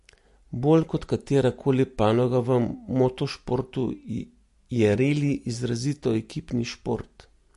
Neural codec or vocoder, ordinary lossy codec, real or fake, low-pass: none; MP3, 48 kbps; real; 14.4 kHz